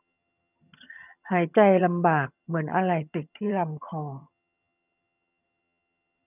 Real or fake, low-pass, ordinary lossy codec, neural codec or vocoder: fake; 3.6 kHz; none; vocoder, 22.05 kHz, 80 mel bands, HiFi-GAN